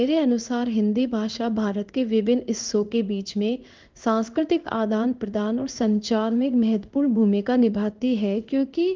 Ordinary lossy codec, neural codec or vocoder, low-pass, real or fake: Opus, 32 kbps; codec, 16 kHz in and 24 kHz out, 1 kbps, XY-Tokenizer; 7.2 kHz; fake